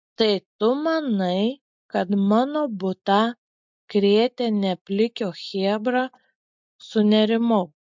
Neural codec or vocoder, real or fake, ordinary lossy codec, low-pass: none; real; MP3, 64 kbps; 7.2 kHz